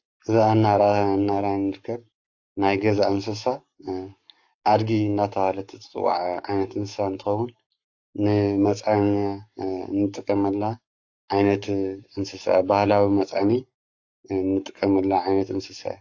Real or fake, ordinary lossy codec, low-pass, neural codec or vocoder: fake; AAC, 48 kbps; 7.2 kHz; codec, 44.1 kHz, 7.8 kbps, Pupu-Codec